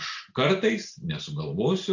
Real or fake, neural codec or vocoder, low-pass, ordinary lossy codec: real; none; 7.2 kHz; AAC, 48 kbps